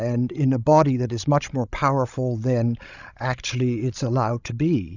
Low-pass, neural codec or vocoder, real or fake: 7.2 kHz; codec, 16 kHz, 16 kbps, FreqCodec, larger model; fake